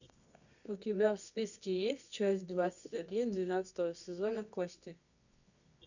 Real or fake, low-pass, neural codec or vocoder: fake; 7.2 kHz; codec, 24 kHz, 0.9 kbps, WavTokenizer, medium music audio release